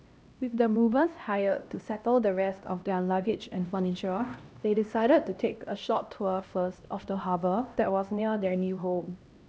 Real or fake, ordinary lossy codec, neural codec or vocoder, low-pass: fake; none; codec, 16 kHz, 1 kbps, X-Codec, HuBERT features, trained on LibriSpeech; none